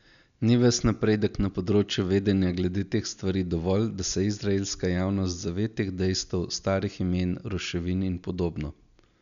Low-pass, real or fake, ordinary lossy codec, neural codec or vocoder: 7.2 kHz; real; none; none